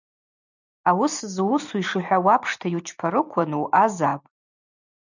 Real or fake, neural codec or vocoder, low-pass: fake; vocoder, 44.1 kHz, 128 mel bands every 256 samples, BigVGAN v2; 7.2 kHz